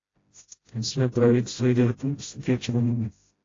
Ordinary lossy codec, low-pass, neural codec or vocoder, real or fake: AAC, 32 kbps; 7.2 kHz; codec, 16 kHz, 0.5 kbps, FreqCodec, smaller model; fake